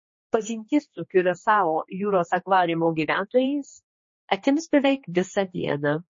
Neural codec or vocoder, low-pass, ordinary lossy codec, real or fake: codec, 16 kHz, 2 kbps, X-Codec, HuBERT features, trained on general audio; 7.2 kHz; MP3, 32 kbps; fake